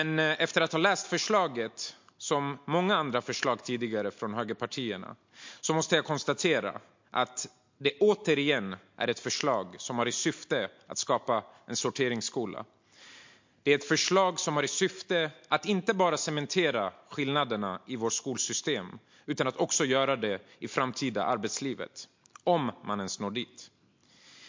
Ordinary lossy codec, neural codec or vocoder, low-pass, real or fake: MP3, 48 kbps; none; 7.2 kHz; real